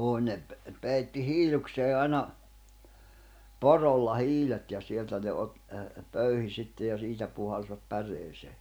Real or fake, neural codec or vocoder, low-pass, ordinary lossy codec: real; none; none; none